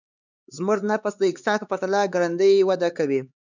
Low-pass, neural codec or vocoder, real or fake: 7.2 kHz; codec, 16 kHz, 4 kbps, X-Codec, HuBERT features, trained on LibriSpeech; fake